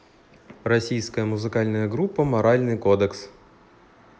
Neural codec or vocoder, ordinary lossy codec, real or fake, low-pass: none; none; real; none